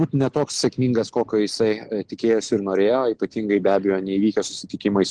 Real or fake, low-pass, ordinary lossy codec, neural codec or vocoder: real; 9.9 kHz; Opus, 16 kbps; none